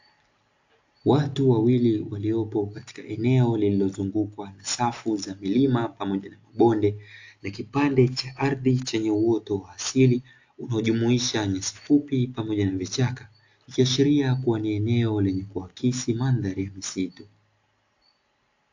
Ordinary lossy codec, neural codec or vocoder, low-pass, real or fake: AAC, 48 kbps; none; 7.2 kHz; real